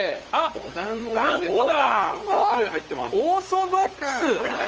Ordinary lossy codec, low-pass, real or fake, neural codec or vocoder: Opus, 24 kbps; 7.2 kHz; fake; codec, 16 kHz, 8 kbps, FunCodec, trained on LibriTTS, 25 frames a second